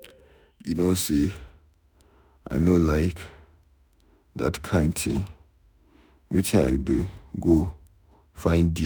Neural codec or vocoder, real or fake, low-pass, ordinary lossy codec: autoencoder, 48 kHz, 32 numbers a frame, DAC-VAE, trained on Japanese speech; fake; none; none